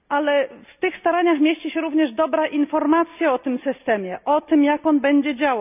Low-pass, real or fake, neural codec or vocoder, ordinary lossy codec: 3.6 kHz; real; none; none